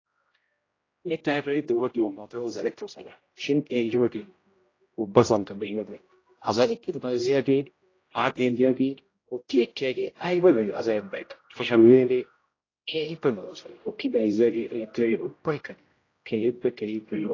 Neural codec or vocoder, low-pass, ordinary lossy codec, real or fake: codec, 16 kHz, 0.5 kbps, X-Codec, HuBERT features, trained on general audio; 7.2 kHz; AAC, 32 kbps; fake